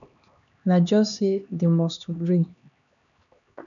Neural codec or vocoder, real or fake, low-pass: codec, 16 kHz, 2 kbps, X-Codec, HuBERT features, trained on LibriSpeech; fake; 7.2 kHz